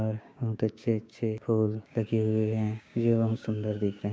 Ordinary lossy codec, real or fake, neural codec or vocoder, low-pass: none; fake; codec, 16 kHz, 6 kbps, DAC; none